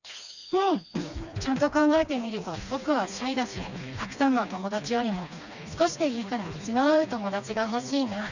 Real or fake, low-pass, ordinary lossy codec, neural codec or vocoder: fake; 7.2 kHz; none; codec, 16 kHz, 2 kbps, FreqCodec, smaller model